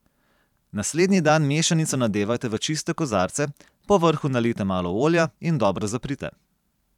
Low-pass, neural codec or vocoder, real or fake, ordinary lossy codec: 19.8 kHz; vocoder, 44.1 kHz, 128 mel bands every 512 samples, BigVGAN v2; fake; none